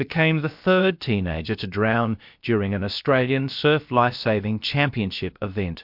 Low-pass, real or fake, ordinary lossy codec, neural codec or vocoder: 5.4 kHz; fake; MP3, 48 kbps; codec, 16 kHz, about 1 kbps, DyCAST, with the encoder's durations